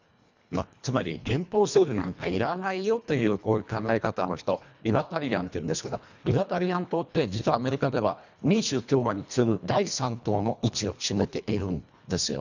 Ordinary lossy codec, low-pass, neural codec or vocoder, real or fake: none; 7.2 kHz; codec, 24 kHz, 1.5 kbps, HILCodec; fake